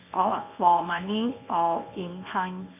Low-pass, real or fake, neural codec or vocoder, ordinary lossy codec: 3.6 kHz; fake; codec, 24 kHz, 0.9 kbps, WavTokenizer, medium speech release version 1; none